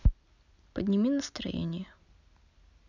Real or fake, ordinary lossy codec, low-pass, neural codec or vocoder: real; none; 7.2 kHz; none